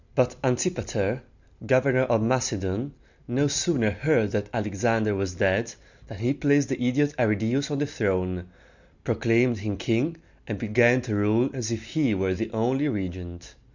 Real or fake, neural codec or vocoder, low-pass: real; none; 7.2 kHz